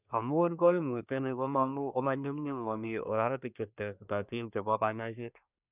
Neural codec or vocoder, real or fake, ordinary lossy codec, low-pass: codec, 24 kHz, 1 kbps, SNAC; fake; none; 3.6 kHz